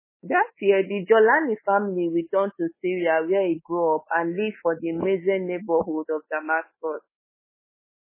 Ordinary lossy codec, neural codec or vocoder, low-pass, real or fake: MP3, 16 kbps; codec, 24 kHz, 3.1 kbps, DualCodec; 3.6 kHz; fake